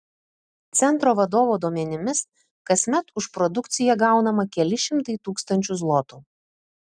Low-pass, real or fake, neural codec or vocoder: 9.9 kHz; real; none